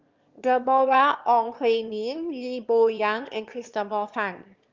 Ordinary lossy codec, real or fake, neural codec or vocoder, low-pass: Opus, 32 kbps; fake; autoencoder, 22.05 kHz, a latent of 192 numbers a frame, VITS, trained on one speaker; 7.2 kHz